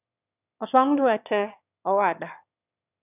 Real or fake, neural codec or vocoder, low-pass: fake; autoencoder, 22.05 kHz, a latent of 192 numbers a frame, VITS, trained on one speaker; 3.6 kHz